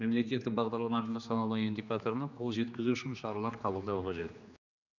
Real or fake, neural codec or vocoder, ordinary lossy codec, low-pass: fake; codec, 16 kHz, 2 kbps, X-Codec, HuBERT features, trained on general audio; none; 7.2 kHz